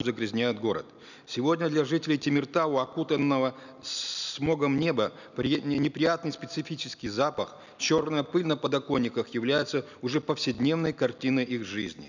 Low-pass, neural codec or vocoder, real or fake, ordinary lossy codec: 7.2 kHz; none; real; none